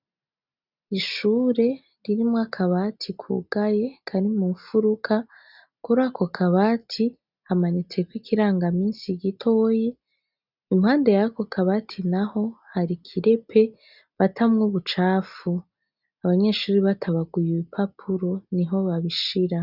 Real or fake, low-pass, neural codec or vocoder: real; 5.4 kHz; none